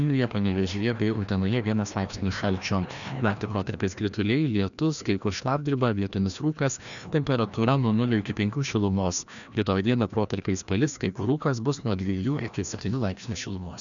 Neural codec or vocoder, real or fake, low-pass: codec, 16 kHz, 1 kbps, FreqCodec, larger model; fake; 7.2 kHz